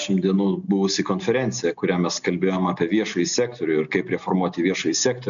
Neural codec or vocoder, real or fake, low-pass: none; real; 7.2 kHz